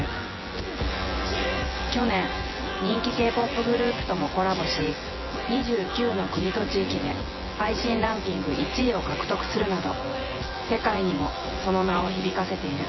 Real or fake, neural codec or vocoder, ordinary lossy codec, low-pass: fake; vocoder, 24 kHz, 100 mel bands, Vocos; MP3, 24 kbps; 7.2 kHz